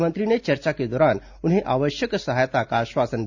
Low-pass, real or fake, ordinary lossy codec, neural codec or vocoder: 7.2 kHz; real; none; none